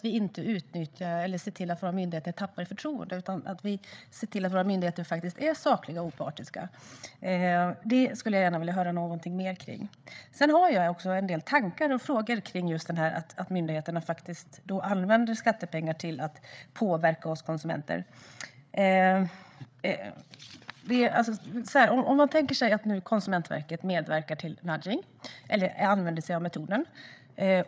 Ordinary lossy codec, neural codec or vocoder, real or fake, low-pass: none; codec, 16 kHz, 8 kbps, FreqCodec, larger model; fake; none